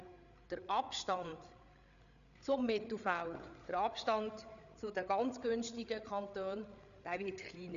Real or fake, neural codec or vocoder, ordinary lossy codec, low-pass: fake; codec, 16 kHz, 8 kbps, FreqCodec, larger model; none; 7.2 kHz